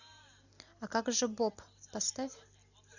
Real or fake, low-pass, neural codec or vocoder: real; 7.2 kHz; none